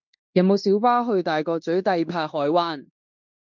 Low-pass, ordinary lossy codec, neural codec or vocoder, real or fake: 7.2 kHz; MP3, 64 kbps; codec, 16 kHz in and 24 kHz out, 0.9 kbps, LongCat-Audio-Codec, fine tuned four codebook decoder; fake